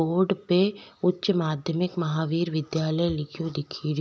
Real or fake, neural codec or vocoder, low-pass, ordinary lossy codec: real; none; none; none